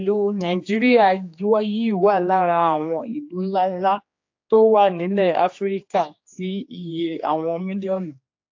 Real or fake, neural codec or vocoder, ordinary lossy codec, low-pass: fake; codec, 16 kHz, 2 kbps, X-Codec, HuBERT features, trained on general audio; AAC, 48 kbps; 7.2 kHz